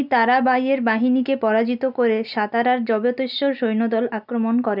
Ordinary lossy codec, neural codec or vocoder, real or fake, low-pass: none; none; real; 5.4 kHz